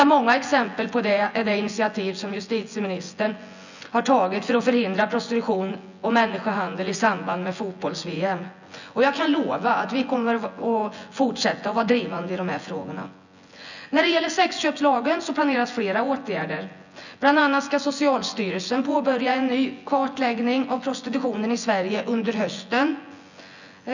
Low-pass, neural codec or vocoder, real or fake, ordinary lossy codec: 7.2 kHz; vocoder, 24 kHz, 100 mel bands, Vocos; fake; none